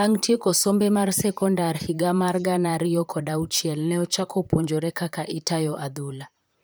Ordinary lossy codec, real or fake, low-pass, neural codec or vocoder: none; fake; none; vocoder, 44.1 kHz, 128 mel bands, Pupu-Vocoder